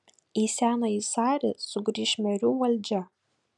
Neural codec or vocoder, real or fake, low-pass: none; real; 10.8 kHz